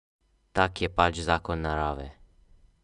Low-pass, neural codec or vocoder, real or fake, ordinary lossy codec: 10.8 kHz; none; real; none